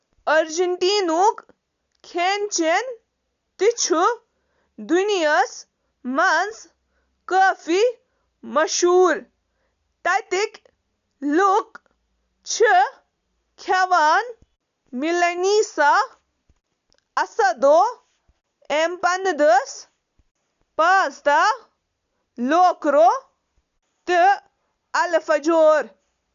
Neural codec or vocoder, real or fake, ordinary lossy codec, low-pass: none; real; none; 7.2 kHz